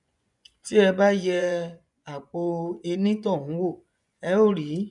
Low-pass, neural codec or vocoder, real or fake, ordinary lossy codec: 10.8 kHz; vocoder, 24 kHz, 100 mel bands, Vocos; fake; none